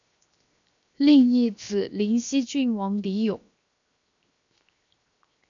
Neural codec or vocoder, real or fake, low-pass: codec, 16 kHz, 0.7 kbps, FocalCodec; fake; 7.2 kHz